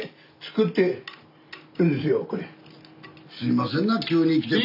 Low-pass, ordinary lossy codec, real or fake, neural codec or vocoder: 5.4 kHz; none; real; none